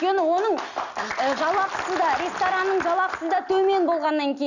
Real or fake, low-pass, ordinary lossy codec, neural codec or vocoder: real; 7.2 kHz; none; none